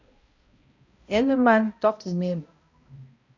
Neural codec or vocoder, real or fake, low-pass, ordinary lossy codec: codec, 16 kHz, 0.5 kbps, X-Codec, HuBERT features, trained on balanced general audio; fake; 7.2 kHz; Opus, 64 kbps